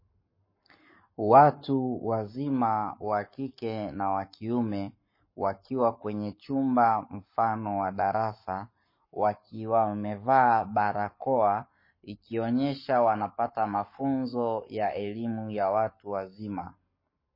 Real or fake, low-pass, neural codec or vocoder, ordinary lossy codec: fake; 5.4 kHz; codec, 44.1 kHz, 7.8 kbps, Pupu-Codec; MP3, 24 kbps